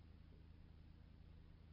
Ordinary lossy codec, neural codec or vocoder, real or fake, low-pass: MP3, 32 kbps; none; real; 5.4 kHz